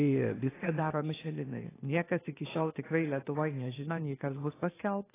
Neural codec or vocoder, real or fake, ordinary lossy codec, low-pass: codec, 16 kHz, 0.8 kbps, ZipCodec; fake; AAC, 16 kbps; 3.6 kHz